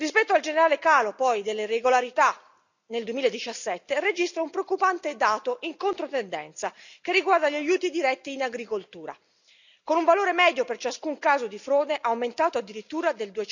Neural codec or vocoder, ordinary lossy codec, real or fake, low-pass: none; none; real; 7.2 kHz